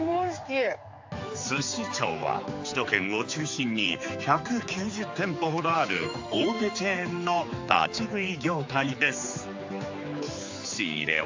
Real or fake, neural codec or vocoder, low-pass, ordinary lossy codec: fake; codec, 16 kHz, 4 kbps, X-Codec, HuBERT features, trained on general audio; 7.2 kHz; AAC, 48 kbps